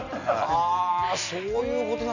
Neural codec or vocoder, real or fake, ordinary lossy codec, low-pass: none; real; none; 7.2 kHz